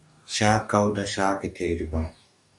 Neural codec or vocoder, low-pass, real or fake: codec, 44.1 kHz, 2.6 kbps, DAC; 10.8 kHz; fake